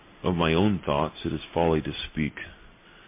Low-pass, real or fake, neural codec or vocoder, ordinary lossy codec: 3.6 kHz; real; none; MP3, 24 kbps